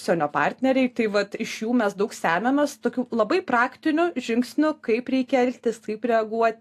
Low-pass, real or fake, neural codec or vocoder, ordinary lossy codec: 14.4 kHz; real; none; AAC, 64 kbps